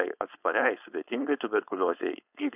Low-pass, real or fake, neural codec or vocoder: 3.6 kHz; fake; codec, 16 kHz, 4.8 kbps, FACodec